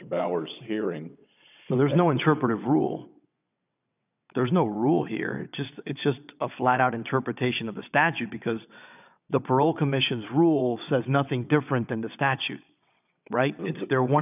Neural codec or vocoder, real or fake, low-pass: vocoder, 22.05 kHz, 80 mel bands, WaveNeXt; fake; 3.6 kHz